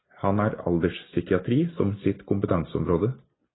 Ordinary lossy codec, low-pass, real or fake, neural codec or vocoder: AAC, 16 kbps; 7.2 kHz; real; none